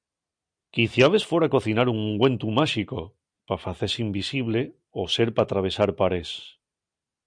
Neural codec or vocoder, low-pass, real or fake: none; 9.9 kHz; real